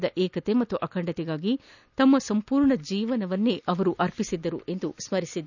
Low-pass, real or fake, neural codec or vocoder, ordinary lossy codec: 7.2 kHz; real; none; none